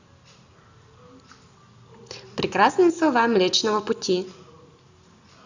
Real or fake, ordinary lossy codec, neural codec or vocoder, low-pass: real; Opus, 64 kbps; none; 7.2 kHz